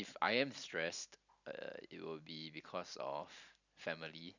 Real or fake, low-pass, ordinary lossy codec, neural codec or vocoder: real; 7.2 kHz; none; none